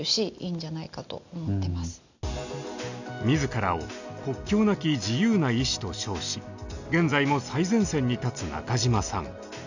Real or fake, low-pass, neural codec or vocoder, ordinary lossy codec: real; 7.2 kHz; none; none